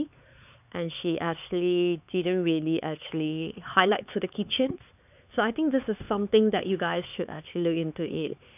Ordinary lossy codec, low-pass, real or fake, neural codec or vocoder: none; 3.6 kHz; fake; codec, 16 kHz, 4 kbps, X-Codec, HuBERT features, trained on LibriSpeech